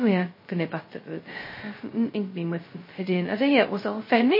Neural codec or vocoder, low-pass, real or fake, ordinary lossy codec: codec, 16 kHz, 0.2 kbps, FocalCodec; 5.4 kHz; fake; MP3, 24 kbps